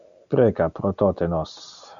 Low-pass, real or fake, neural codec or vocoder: 7.2 kHz; real; none